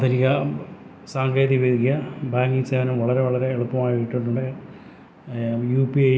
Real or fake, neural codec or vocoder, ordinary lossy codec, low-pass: real; none; none; none